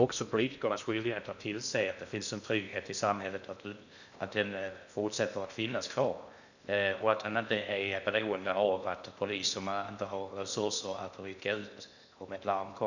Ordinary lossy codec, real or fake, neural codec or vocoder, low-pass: none; fake; codec, 16 kHz in and 24 kHz out, 0.6 kbps, FocalCodec, streaming, 2048 codes; 7.2 kHz